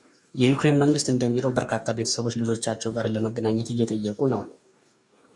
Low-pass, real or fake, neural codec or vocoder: 10.8 kHz; fake; codec, 44.1 kHz, 2.6 kbps, DAC